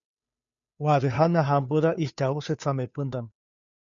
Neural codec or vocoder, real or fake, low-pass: codec, 16 kHz, 2 kbps, FunCodec, trained on Chinese and English, 25 frames a second; fake; 7.2 kHz